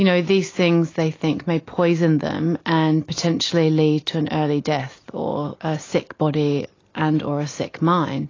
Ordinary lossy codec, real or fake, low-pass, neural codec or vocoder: AAC, 32 kbps; real; 7.2 kHz; none